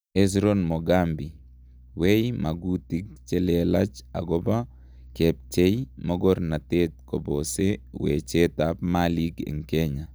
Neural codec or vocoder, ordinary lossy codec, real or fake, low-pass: none; none; real; none